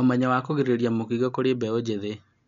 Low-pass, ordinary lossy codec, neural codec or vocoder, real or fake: 7.2 kHz; MP3, 64 kbps; none; real